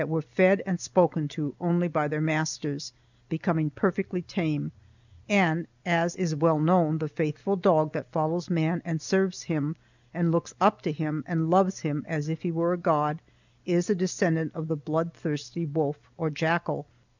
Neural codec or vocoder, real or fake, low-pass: none; real; 7.2 kHz